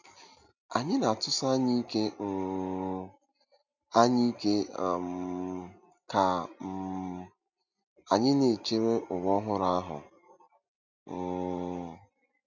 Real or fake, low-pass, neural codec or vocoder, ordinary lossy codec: real; 7.2 kHz; none; none